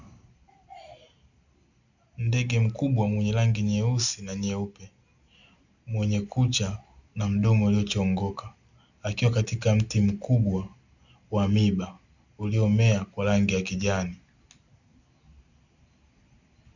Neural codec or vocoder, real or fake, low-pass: none; real; 7.2 kHz